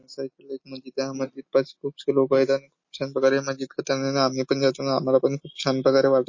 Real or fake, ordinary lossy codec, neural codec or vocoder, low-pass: real; MP3, 32 kbps; none; 7.2 kHz